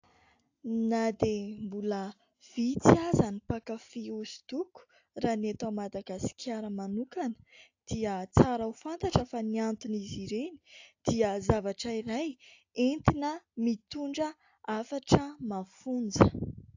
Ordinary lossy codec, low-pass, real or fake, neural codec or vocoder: AAC, 48 kbps; 7.2 kHz; real; none